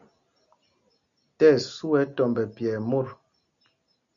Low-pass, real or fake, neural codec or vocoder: 7.2 kHz; real; none